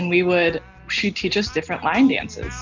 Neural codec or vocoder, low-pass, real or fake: none; 7.2 kHz; real